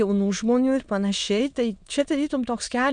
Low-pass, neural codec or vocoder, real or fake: 9.9 kHz; autoencoder, 22.05 kHz, a latent of 192 numbers a frame, VITS, trained on many speakers; fake